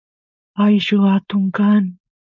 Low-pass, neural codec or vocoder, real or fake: 7.2 kHz; codec, 16 kHz, 4.8 kbps, FACodec; fake